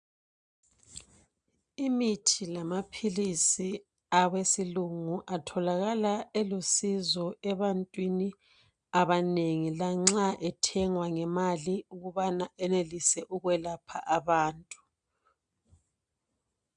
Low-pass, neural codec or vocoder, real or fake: 9.9 kHz; none; real